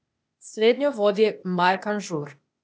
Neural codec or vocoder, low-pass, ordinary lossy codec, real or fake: codec, 16 kHz, 0.8 kbps, ZipCodec; none; none; fake